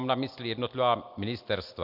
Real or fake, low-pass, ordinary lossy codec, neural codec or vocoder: real; 5.4 kHz; MP3, 48 kbps; none